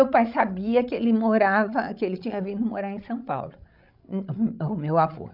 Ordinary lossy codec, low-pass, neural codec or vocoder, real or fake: none; 5.4 kHz; codec, 16 kHz, 16 kbps, FreqCodec, larger model; fake